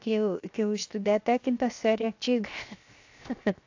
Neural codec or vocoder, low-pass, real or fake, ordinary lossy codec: codec, 16 kHz, 0.7 kbps, FocalCodec; 7.2 kHz; fake; AAC, 48 kbps